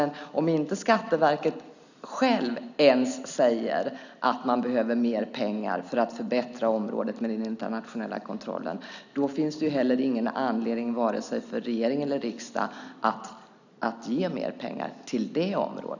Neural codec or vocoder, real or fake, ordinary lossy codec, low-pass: none; real; none; 7.2 kHz